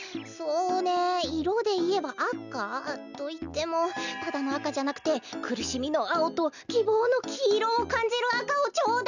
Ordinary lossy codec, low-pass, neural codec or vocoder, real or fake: none; 7.2 kHz; none; real